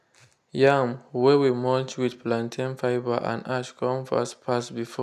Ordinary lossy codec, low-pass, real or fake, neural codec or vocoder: none; 14.4 kHz; real; none